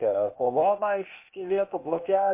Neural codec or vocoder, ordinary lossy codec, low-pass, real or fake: codec, 16 kHz, 0.8 kbps, ZipCodec; MP3, 24 kbps; 3.6 kHz; fake